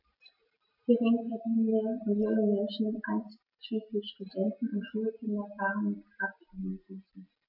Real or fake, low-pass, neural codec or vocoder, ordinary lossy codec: real; 5.4 kHz; none; none